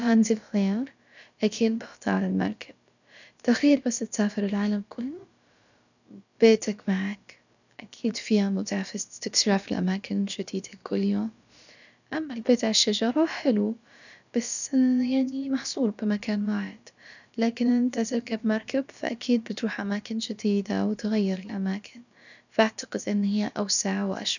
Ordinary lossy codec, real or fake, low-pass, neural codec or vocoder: none; fake; 7.2 kHz; codec, 16 kHz, about 1 kbps, DyCAST, with the encoder's durations